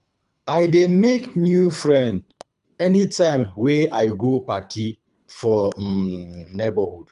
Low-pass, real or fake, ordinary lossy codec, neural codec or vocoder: 10.8 kHz; fake; none; codec, 24 kHz, 3 kbps, HILCodec